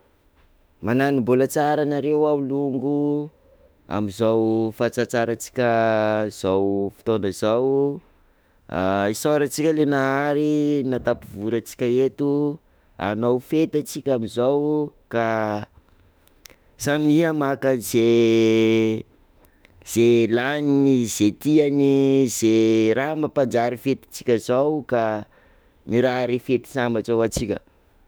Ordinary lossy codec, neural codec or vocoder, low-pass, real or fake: none; autoencoder, 48 kHz, 32 numbers a frame, DAC-VAE, trained on Japanese speech; none; fake